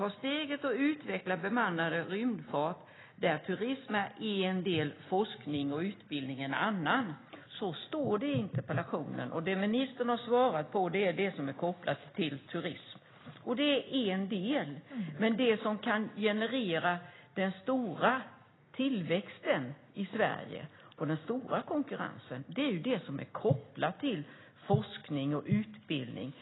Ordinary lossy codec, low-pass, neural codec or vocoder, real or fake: AAC, 16 kbps; 7.2 kHz; none; real